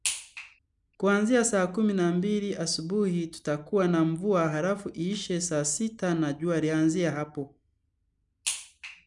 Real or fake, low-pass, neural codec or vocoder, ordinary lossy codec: real; 10.8 kHz; none; none